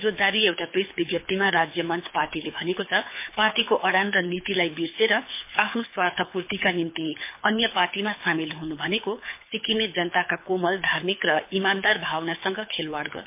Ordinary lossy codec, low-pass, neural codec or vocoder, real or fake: MP3, 24 kbps; 3.6 kHz; codec, 24 kHz, 6 kbps, HILCodec; fake